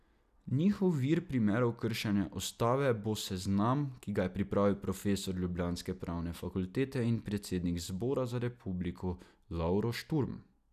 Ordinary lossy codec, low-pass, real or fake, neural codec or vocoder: none; 14.4 kHz; real; none